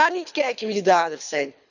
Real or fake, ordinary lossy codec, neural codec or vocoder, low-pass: fake; none; codec, 24 kHz, 3 kbps, HILCodec; 7.2 kHz